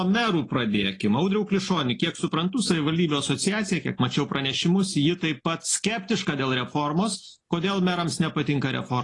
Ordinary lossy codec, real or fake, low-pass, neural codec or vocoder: AAC, 32 kbps; real; 10.8 kHz; none